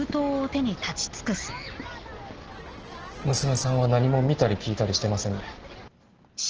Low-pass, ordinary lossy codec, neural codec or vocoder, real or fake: 7.2 kHz; Opus, 16 kbps; none; real